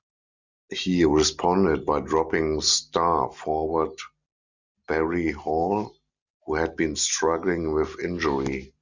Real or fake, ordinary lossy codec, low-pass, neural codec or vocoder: real; Opus, 64 kbps; 7.2 kHz; none